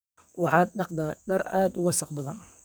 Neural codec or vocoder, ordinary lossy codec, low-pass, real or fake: codec, 44.1 kHz, 2.6 kbps, SNAC; none; none; fake